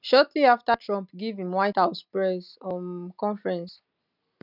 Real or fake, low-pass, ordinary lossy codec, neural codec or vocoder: real; 5.4 kHz; none; none